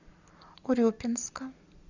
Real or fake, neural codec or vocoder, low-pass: real; none; 7.2 kHz